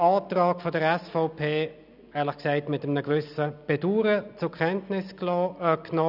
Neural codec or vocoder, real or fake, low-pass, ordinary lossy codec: none; real; 5.4 kHz; none